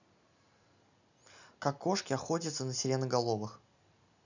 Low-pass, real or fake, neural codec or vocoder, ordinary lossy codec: 7.2 kHz; real; none; none